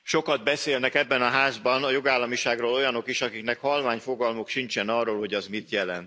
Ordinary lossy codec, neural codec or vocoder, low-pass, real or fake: none; none; none; real